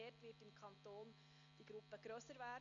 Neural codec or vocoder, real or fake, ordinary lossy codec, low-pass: none; real; none; 7.2 kHz